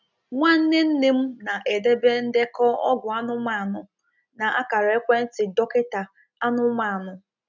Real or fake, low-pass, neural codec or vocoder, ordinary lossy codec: real; 7.2 kHz; none; none